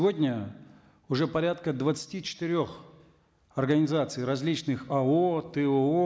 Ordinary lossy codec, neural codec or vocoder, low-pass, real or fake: none; none; none; real